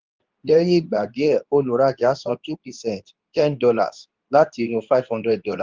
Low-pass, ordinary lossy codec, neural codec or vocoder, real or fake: 7.2 kHz; Opus, 24 kbps; codec, 24 kHz, 0.9 kbps, WavTokenizer, medium speech release version 2; fake